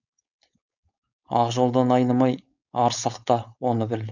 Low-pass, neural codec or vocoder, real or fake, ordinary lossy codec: 7.2 kHz; codec, 16 kHz, 4.8 kbps, FACodec; fake; none